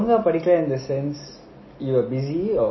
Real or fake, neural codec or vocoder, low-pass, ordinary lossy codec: real; none; 7.2 kHz; MP3, 24 kbps